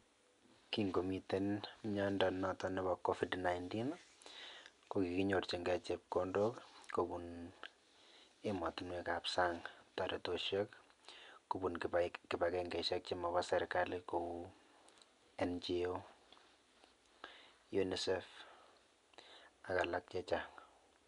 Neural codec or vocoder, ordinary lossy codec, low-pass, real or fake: none; none; 10.8 kHz; real